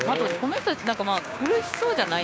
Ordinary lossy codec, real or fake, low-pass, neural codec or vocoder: none; fake; none; codec, 16 kHz, 6 kbps, DAC